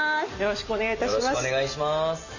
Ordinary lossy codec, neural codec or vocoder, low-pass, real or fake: none; none; 7.2 kHz; real